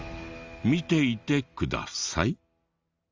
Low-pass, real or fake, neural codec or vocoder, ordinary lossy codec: 7.2 kHz; real; none; Opus, 32 kbps